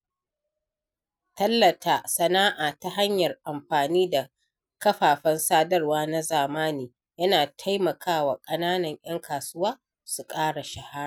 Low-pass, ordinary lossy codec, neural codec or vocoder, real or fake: none; none; none; real